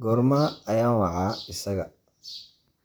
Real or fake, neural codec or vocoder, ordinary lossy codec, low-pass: fake; vocoder, 44.1 kHz, 128 mel bands, Pupu-Vocoder; none; none